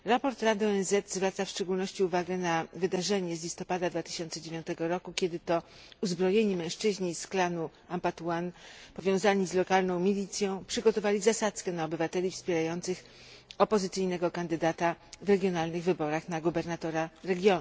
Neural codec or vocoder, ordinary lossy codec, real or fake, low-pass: none; none; real; none